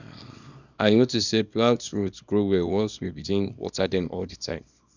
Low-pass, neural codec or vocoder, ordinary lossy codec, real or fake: 7.2 kHz; codec, 24 kHz, 0.9 kbps, WavTokenizer, small release; none; fake